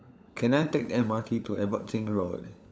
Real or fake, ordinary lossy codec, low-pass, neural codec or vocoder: fake; none; none; codec, 16 kHz, 16 kbps, FunCodec, trained on LibriTTS, 50 frames a second